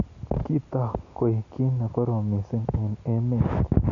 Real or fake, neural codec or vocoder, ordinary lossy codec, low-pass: real; none; none; 7.2 kHz